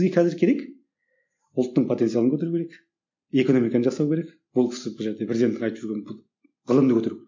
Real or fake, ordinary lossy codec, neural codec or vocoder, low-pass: real; MP3, 48 kbps; none; 7.2 kHz